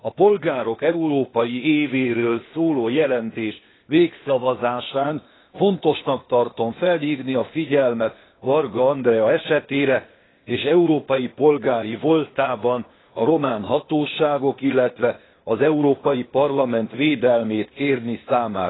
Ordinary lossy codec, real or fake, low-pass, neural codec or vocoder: AAC, 16 kbps; fake; 7.2 kHz; codec, 16 kHz, 0.8 kbps, ZipCodec